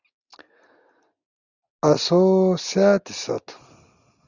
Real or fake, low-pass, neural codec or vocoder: real; 7.2 kHz; none